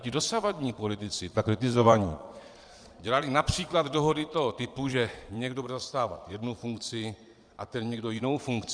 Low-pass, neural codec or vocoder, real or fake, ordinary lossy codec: 9.9 kHz; vocoder, 22.05 kHz, 80 mel bands, Vocos; fake; Opus, 64 kbps